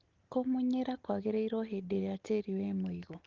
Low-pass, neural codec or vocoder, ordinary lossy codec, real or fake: 7.2 kHz; none; Opus, 16 kbps; real